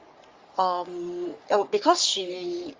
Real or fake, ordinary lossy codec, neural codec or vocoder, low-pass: fake; Opus, 32 kbps; codec, 44.1 kHz, 3.4 kbps, Pupu-Codec; 7.2 kHz